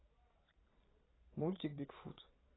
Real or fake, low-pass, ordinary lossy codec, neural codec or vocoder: fake; 7.2 kHz; AAC, 16 kbps; vocoder, 22.05 kHz, 80 mel bands, Vocos